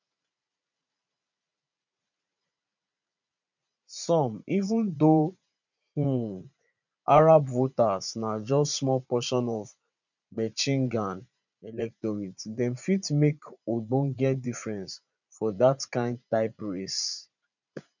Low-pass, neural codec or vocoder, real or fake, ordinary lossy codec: 7.2 kHz; vocoder, 44.1 kHz, 80 mel bands, Vocos; fake; none